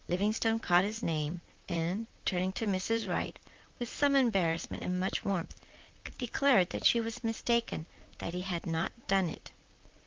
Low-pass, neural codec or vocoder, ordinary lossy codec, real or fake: 7.2 kHz; vocoder, 44.1 kHz, 128 mel bands, Pupu-Vocoder; Opus, 32 kbps; fake